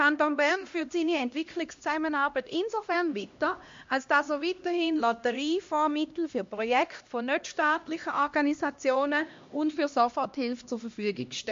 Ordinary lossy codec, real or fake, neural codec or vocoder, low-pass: MP3, 48 kbps; fake; codec, 16 kHz, 1 kbps, X-Codec, HuBERT features, trained on LibriSpeech; 7.2 kHz